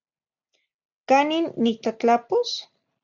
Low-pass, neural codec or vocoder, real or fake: 7.2 kHz; none; real